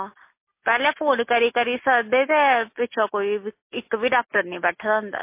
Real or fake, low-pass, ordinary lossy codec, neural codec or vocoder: real; 3.6 kHz; MP3, 24 kbps; none